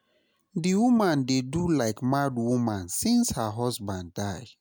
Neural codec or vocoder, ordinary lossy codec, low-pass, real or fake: none; none; none; real